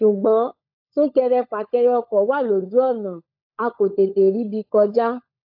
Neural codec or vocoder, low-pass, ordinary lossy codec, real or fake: codec, 16 kHz, 16 kbps, FunCodec, trained on LibriTTS, 50 frames a second; 5.4 kHz; AAC, 48 kbps; fake